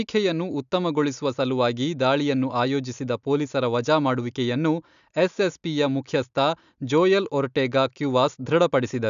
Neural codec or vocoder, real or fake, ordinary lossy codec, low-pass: none; real; none; 7.2 kHz